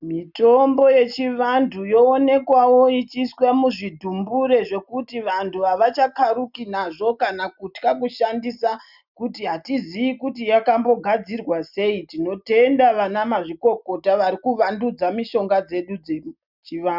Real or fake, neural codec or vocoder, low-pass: real; none; 5.4 kHz